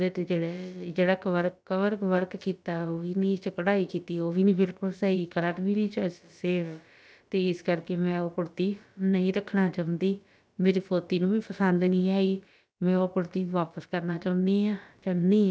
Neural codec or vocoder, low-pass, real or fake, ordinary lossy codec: codec, 16 kHz, about 1 kbps, DyCAST, with the encoder's durations; none; fake; none